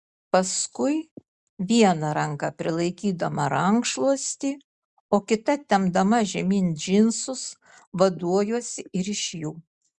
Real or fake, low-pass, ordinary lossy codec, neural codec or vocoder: real; 10.8 kHz; Opus, 64 kbps; none